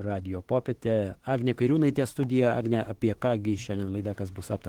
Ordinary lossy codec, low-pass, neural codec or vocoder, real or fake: Opus, 16 kbps; 19.8 kHz; autoencoder, 48 kHz, 32 numbers a frame, DAC-VAE, trained on Japanese speech; fake